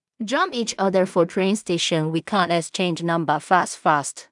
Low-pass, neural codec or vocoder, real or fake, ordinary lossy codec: 10.8 kHz; codec, 16 kHz in and 24 kHz out, 0.4 kbps, LongCat-Audio-Codec, two codebook decoder; fake; none